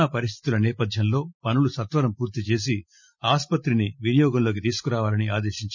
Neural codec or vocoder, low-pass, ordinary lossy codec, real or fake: none; 7.2 kHz; none; real